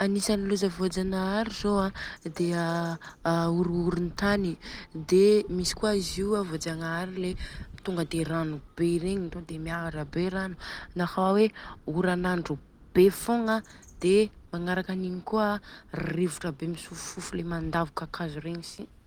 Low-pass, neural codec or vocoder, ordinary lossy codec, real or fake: 19.8 kHz; none; Opus, 24 kbps; real